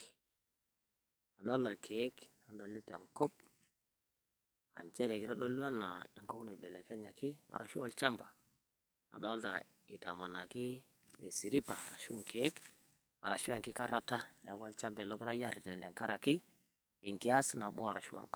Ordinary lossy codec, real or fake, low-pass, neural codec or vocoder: none; fake; none; codec, 44.1 kHz, 2.6 kbps, SNAC